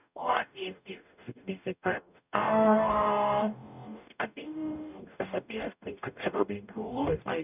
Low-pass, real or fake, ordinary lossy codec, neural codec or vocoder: 3.6 kHz; fake; none; codec, 44.1 kHz, 0.9 kbps, DAC